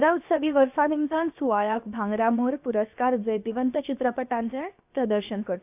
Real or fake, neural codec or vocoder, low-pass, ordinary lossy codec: fake; codec, 16 kHz, about 1 kbps, DyCAST, with the encoder's durations; 3.6 kHz; AAC, 32 kbps